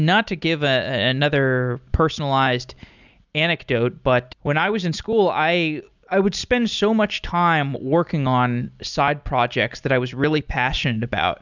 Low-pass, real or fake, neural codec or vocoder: 7.2 kHz; real; none